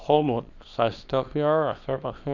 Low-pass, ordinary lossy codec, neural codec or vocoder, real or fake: 7.2 kHz; none; codec, 24 kHz, 0.9 kbps, WavTokenizer, small release; fake